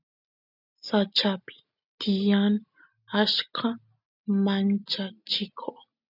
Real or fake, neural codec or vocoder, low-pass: real; none; 5.4 kHz